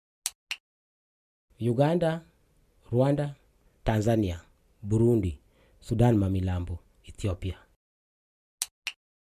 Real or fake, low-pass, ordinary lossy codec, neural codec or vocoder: real; 14.4 kHz; MP3, 96 kbps; none